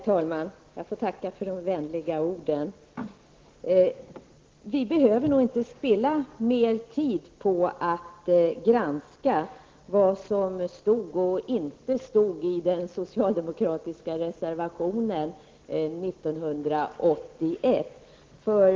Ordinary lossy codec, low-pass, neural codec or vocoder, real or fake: Opus, 16 kbps; 7.2 kHz; none; real